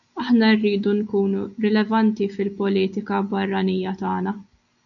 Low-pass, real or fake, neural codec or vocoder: 7.2 kHz; real; none